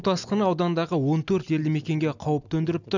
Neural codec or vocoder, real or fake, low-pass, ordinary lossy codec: none; real; 7.2 kHz; none